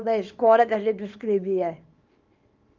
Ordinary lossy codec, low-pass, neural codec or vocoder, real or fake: Opus, 32 kbps; 7.2 kHz; codec, 24 kHz, 0.9 kbps, WavTokenizer, small release; fake